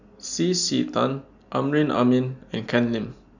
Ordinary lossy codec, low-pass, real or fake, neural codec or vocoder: none; 7.2 kHz; real; none